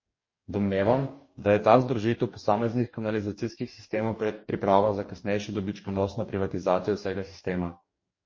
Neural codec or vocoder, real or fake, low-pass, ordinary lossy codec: codec, 44.1 kHz, 2.6 kbps, DAC; fake; 7.2 kHz; MP3, 32 kbps